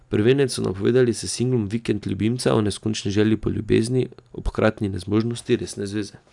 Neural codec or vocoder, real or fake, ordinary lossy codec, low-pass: none; real; none; 10.8 kHz